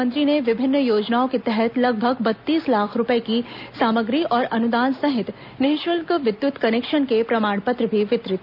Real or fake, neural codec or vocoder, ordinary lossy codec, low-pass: real; none; none; 5.4 kHz